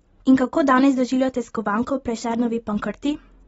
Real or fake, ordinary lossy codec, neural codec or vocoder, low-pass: real; AAC, 24 kbps; none; 19.8 kHz